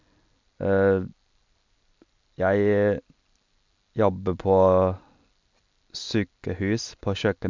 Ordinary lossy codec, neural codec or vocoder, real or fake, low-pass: MP3, 64 kbps; none; real; 7.2 kHz